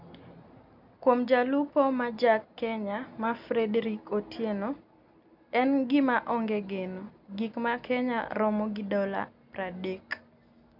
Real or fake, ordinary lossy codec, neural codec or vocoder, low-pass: real; none; none; 5.4 kHz